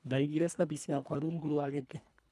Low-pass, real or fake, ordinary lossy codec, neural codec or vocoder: none; fake; none; codec, 24 kHz, 1.5 kbps, HILCodec